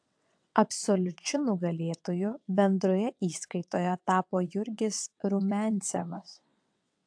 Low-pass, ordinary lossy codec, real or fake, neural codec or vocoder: 9.9 kHz; AAC, 64 kbps; fake; vocoder, 22.05 kHz, 80 mel bands, WaveNeXt